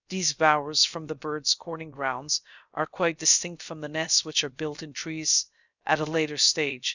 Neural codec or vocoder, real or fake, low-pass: codec, 16 kHz, 0.3 kbps, FocalCodec; fake; 7.2 kHz